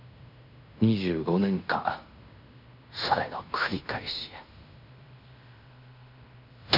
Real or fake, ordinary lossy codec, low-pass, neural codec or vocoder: fake; none; 5.4 kHz; codec, 16 kHz in and 24 kHz out, 0.9 kbps, LongCat-Audio-Codec, fine tuned four codebook decoder